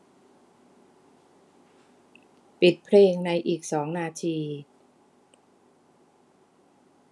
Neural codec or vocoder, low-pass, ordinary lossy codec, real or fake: none; none; none; real